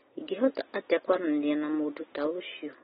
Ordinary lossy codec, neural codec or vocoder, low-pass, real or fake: AAC, 16 kbps; none; 10.8 kHz; real